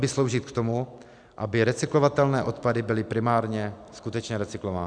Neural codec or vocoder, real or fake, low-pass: none; real; 9.9 kHz